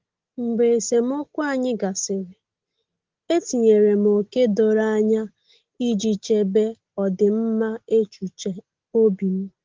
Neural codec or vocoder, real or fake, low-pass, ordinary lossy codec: none; real; 7.2 kHz; Opus, 16 kbps